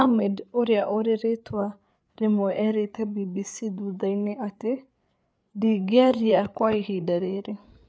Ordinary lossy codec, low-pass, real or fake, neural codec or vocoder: none; none; fake; codec, 16 kHz, 8 kbps, FreqCodec, larger model